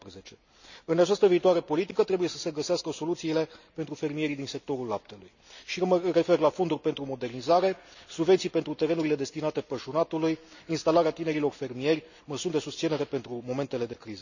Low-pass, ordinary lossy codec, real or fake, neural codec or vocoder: 7.2 kHz; none; real; none